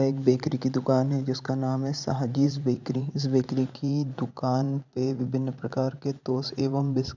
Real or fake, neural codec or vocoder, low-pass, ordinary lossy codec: fake; vocoder, 44.1 kHz, 80 mel bands, Vocos; 7.2 kHz; none